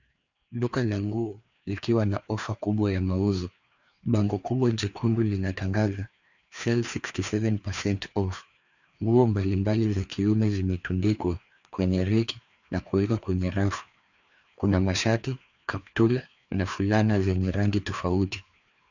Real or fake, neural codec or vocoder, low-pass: fake; codec, 16 kHz, 2 kbps, FreqCodec, larger model; 7.2 kHz